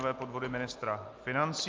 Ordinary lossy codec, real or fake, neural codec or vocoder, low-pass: Opus, 24 kbps; real; none; 7.2 kHz